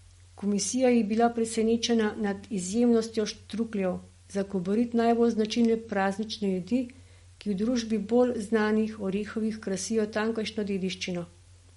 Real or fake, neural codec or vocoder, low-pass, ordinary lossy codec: real; none; 19.8 kHz; MP3, 48 kbps